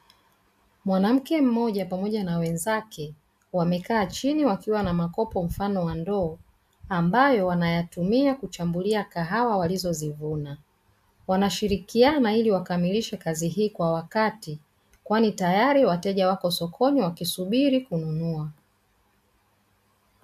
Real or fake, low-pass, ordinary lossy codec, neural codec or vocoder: real; 14.4 kHz; AAC, 96 kbps; none